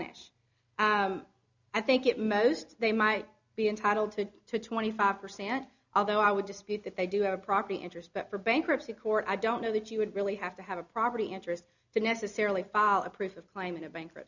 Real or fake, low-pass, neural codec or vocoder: real; 7.2 kHz; none